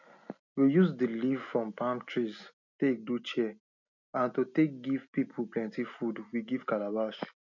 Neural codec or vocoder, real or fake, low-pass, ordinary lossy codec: none; real; 7.2 kHz; none